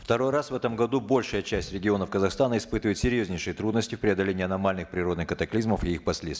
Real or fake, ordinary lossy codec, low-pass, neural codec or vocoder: real; none; none; none